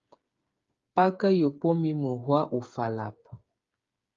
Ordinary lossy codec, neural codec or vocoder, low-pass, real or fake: Opus, 32 kbps; codec, 16 kHz, 8 kbps, FreqCodec, smaller model; 7.2 kHz; fake